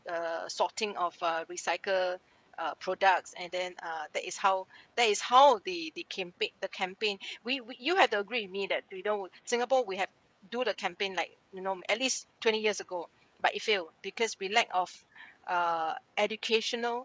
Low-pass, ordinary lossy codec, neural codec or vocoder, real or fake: none; none; codec, 16 kHz, 16 kbps, FreqCodec, smaller model; fake